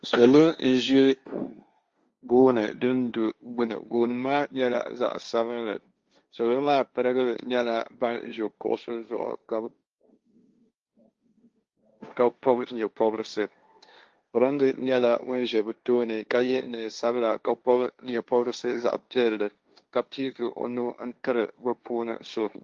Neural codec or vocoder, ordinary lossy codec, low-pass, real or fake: codec, 16 kHz, 1.1 kbps, Voila-Tokenizer; Opus, 32 kbps; 7.2 kHz; fake